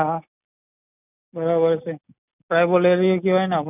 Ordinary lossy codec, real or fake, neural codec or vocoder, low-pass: none; real; none; 3.6 kHz